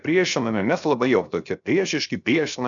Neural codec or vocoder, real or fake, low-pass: codec, 16 kHz, 0.7 kbps, FocalCodec; fake; 7.2 kHz